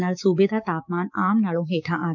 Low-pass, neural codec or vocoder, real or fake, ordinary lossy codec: 7.2 kHz; codec, 16 kHz, 6 kbps, DAC; fake; none